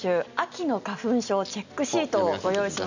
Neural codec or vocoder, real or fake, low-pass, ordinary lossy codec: none; real; 7.2 kHz; none